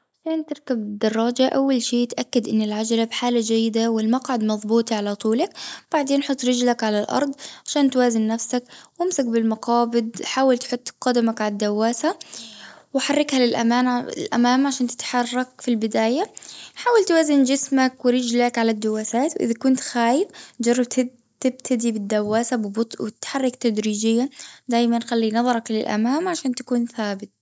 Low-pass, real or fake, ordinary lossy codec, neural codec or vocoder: none; real; none; none